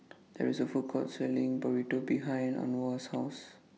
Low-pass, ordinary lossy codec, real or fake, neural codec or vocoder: none; none; real; none